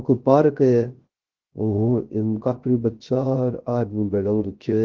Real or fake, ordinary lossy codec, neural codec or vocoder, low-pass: fake; Opus, 16 kbps; codec, 16 kHz, 0.3 kbps, FocalCodec; 7.2 kHz